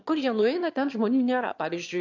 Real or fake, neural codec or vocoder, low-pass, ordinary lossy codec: fake; autoencoder, 22.05 kHz, a latent of 192 numbers a frame, VITS, trained on one speaker; 7.2 kHz; AAC, 48 kbps